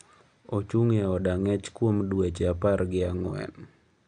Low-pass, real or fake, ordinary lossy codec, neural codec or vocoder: 9.9 kHz; real; none; none